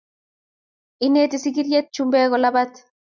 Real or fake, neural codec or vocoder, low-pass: real; none; 7.2 kHz